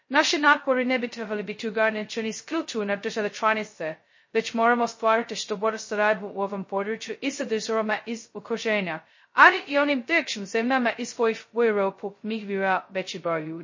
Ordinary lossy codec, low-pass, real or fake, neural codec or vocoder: MP3, 32 kbps; 7.2 kHz; fake; codec, 16 kHz, 0.2 kbps, FocalCodec